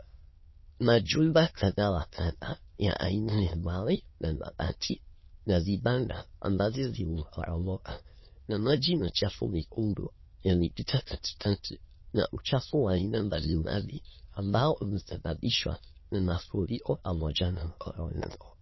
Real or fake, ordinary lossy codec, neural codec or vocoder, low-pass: fake; MP3, 24 kbps; autoencoder, 22.05 kHz, a latent of 192 numbers a frame, VITS, trained on many speakers; 7.2 kHz